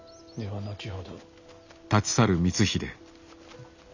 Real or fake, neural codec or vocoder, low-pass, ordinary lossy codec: real; none; 7.2 kHz; none